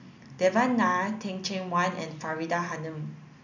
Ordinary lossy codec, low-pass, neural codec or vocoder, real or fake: none; 7.2 kHz; none; real